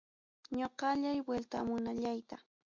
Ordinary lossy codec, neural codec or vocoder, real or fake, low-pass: MP3, 64 kbps; none; real; 7.2 kHz